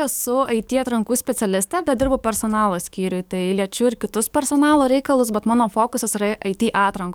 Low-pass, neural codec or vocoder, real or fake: 19.8 kHz; codec, 44.1 kHz, 7.8 kbps, DAC; fake